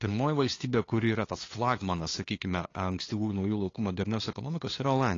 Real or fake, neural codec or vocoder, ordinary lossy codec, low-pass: fake; codec, 16 kHz, 2 kbps, FunCodec, trained on LibriTTS, 25 frames a second; AAC, 32 kbps; 7.2 kHz